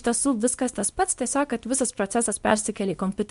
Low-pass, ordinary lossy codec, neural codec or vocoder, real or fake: 10.8 kHz; MP3, 64 kbps; codec, 24 kHz, 0.9 kbps, WavTokenizer, small release; fake